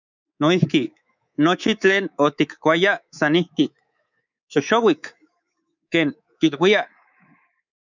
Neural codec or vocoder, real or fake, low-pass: codec, 24 kHz, 3.1 kbps, DualCodec; fake; 7.2 kHz